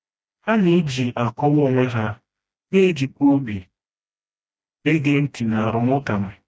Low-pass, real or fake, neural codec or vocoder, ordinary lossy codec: none; fake; codec, 16 kHz, 1 kbps, FreqCodec, smaller model; none